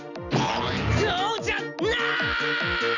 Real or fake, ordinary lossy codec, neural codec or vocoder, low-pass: real; none; none; 7.2 kHz